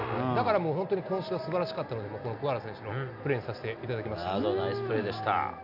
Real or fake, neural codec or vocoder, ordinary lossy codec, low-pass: real; none; none; 5.4 kHz